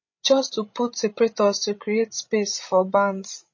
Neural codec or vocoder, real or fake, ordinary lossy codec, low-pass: codec, 16 kHz, 16 kbps, FreqCodec, larger model; fake; MP3, 32 kbps; 7.2 kHz